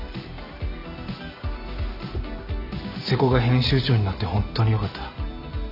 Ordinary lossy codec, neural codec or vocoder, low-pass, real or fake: none; none; 5.4 kHz; real